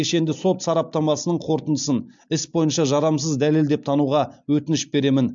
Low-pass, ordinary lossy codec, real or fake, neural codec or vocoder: 7.2 kHz; MP3, 64 kbps; real; none